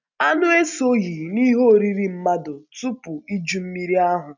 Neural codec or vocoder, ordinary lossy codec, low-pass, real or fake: none; none; 7.2 kHz; real